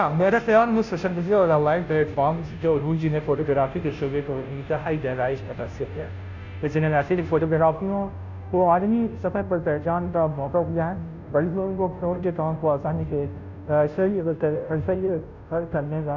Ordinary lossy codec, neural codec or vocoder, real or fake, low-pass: none; codec, 16 kHz, 0.5 kbps, FunCodec, trained on Chinese and English, 25 frames a second; fake; 7.2 kHz